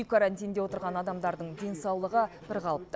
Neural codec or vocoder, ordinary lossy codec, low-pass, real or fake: none; none; none; real